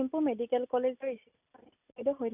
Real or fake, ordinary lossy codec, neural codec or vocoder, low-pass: real; none; none; 3.6 kHz